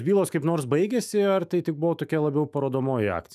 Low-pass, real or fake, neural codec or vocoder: 14.4 kHz; fake; autoencoder, 48 kHz, 128 numbers a frame, DAC-VAE, trained on Japanese speech